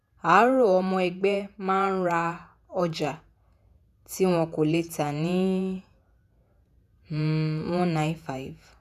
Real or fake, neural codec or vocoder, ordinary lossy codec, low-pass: fake; vocoder, 48 kHz, 128 mel bands, Vocos; none; 14.4 kHz